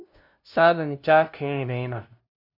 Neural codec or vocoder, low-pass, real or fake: codec, 16 kHz, 0.5 kbps, FunCodec, trained on LibriTTS, 25 frames a second; 5.4 kHz; fake